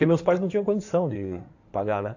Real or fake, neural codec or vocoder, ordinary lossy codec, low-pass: fake; codec, 16 kHz in and 24 kHz out, 2.2 kbps, FireRedTTS-2 codec; AAC, 48 kbps; 7.2 kHz